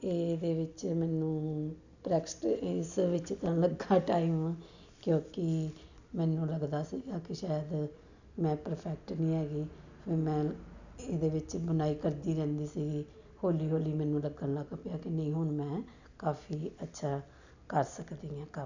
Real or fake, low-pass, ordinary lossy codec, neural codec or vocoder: real; 7.2 kHz; none; none